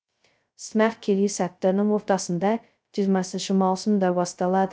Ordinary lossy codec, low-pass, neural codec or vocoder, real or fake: none; none; codec, 16 kHz, 0.2 kbps, FocalCodec; fake